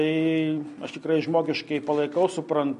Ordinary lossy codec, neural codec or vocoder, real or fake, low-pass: MP3, 48 kbps; none; real; 10.8 kHz